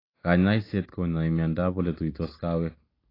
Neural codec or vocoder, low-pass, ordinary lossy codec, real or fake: none; 5.4 kHz; AAC, 24 kbps; real